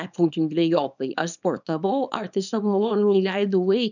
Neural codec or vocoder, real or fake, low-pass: codec, 24 kHz, 0.9 kbps, WavTokenizer, small release; fake; 7.2 kHz